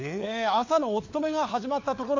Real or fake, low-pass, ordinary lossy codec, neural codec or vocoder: fake; 7.2 kHz; AAC, 48 kbps; codec, 16 kHz, 4 kbps, FunCodec, trained on LibriTTS, 50 frames a second